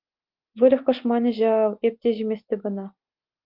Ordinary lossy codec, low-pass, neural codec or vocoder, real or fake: Opus, 24 kbps; 5.4 kHz; none; real